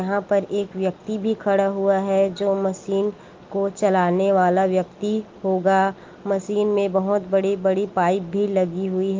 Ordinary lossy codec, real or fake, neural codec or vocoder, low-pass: Opus, 32 kbps; real; none; 7.2 kHz